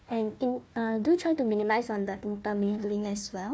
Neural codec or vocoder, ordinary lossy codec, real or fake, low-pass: codec, 16 kHz, 1 kbps, FunCodec, trained on Chinese and English, 50 frames a second; none; fake; none